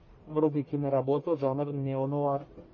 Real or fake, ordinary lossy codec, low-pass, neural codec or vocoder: fake; MP3, 32 kbps; 7.2 kHz; codec, 44.1 kHz, 1.7 kbps, Pupu-Codec